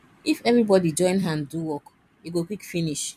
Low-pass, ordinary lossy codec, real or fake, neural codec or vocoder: 14.4 kHz; MP3, 96 kbps; fake; vocoder, 44.1 kHz, 128 mel bands every 256 samples, BigVGAN v2